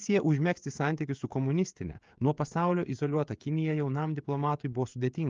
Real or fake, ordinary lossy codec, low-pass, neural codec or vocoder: fake; Opus, 24 kbps; 7.2 kHz; codec, 16 kHz, 16 kbps, FreqCodec, smaller model